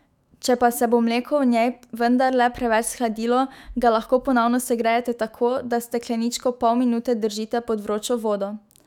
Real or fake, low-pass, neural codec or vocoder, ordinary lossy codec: fake; 19.8 kHz; autoencoder, 48 kHz, 128 numbers a frame, DAC-VAE, trained on Japanese speech; none